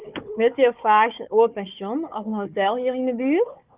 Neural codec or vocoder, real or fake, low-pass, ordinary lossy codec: codec, 16 kHz, 4 kbps, FunCodec, trained on Chinese and English, 50 frames a second; fake; 3.6 kHz; Opus, 24 kbps